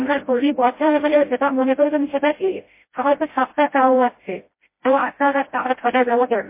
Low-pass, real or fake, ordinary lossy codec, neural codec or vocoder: 3.6 kHz; fake; MP3, 24 kbps; codec, 16 kHz, 0.5 kbps, FreqCodec, smaller model